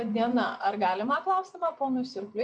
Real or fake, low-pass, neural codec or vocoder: fake; 9.9 kHz; vocoder, 24 kHz, 100 mel bands, Vocos